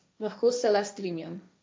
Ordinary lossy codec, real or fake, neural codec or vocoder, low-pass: none; fake; codec, 16 kHz, 1.1 kbps, Voila-Tokenizer; none